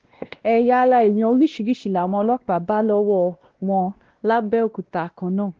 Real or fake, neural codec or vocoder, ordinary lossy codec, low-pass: fake; codec, 16 kHz, 1 kbps, X-Codec, WavLM features, trained on Multilingual LibriSpeech; Opus, 16 kbps; 7.2 kHz